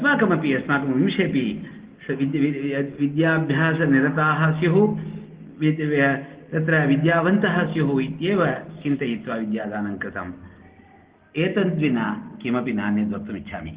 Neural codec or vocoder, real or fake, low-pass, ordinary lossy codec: none; real; 3.6 kHz; Opus, 16 kbps